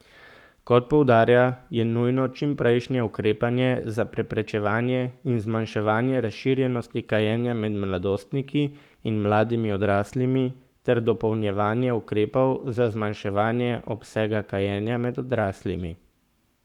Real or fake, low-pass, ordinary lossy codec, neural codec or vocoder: fake; 19.8 kHz; none; codec, 44.1 kHz, 7.8 kbps, Pupu-Codec